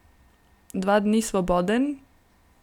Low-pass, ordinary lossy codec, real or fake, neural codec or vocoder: 19.8 kHz; none; real; none